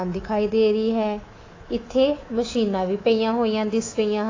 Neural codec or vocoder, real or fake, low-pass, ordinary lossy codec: codec, 24 kHz, 3.1 kbps, DualCodec; fake; 7.2 kHz; AAC, 32 kbps